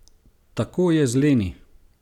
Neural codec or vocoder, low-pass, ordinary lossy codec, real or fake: vocoder, 44.1 kHz, 128 mel bands every 256 samples, BigVGAN v2; 19.8 kHz; none; fake